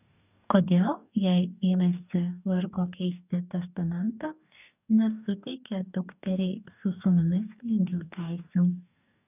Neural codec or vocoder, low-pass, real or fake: codec, 44.1 kHz, 2.6 kbps, DAC; 3.6 kHz; fake